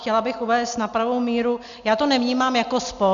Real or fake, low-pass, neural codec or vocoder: real; 7.2 kHz; none